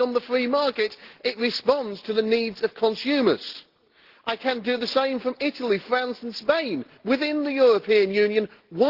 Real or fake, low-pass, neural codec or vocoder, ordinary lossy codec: real; 5.4 kHz; none; Opus, 16 kbps